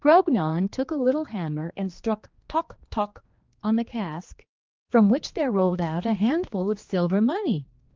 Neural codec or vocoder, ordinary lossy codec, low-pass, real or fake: codec, 16 kHz, 2 kbps, X-Codec, HuBERT features, trained on general audio; Opus, 32 kbps; 7.2 kHz; fake